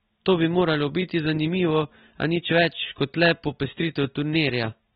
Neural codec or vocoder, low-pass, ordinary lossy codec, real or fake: none; 19.8 kHz; AAC, 16 kbps; real